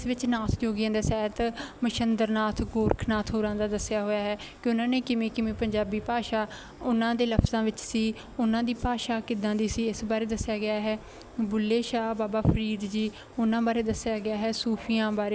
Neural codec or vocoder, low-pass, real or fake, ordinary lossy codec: none; none; real; none